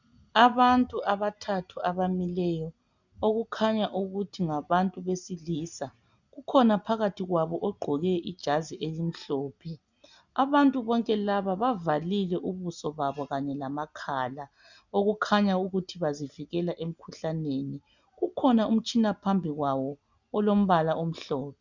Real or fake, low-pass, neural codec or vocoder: real; 7.2 kHz; none